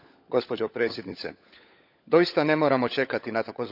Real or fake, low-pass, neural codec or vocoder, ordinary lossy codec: fake; 5.4 kHz; codec, 16 kHz, 16 kbps, FunCodec, trained on LibriTTS, 50 frames a second; none